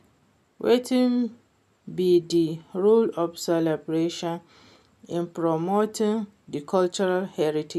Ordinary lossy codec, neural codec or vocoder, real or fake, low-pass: none; none; real; 14.4 kHz